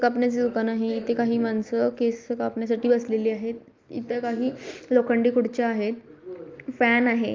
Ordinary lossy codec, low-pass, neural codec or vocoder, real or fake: Opus, 24 kbps; 7.2 kHz; none; real